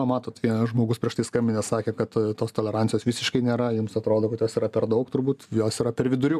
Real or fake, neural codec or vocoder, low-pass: real; none; 14.4 kHz